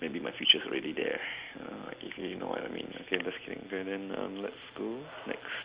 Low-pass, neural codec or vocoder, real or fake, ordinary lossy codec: 3.6 kHz; none; real; Opus, 32 kbps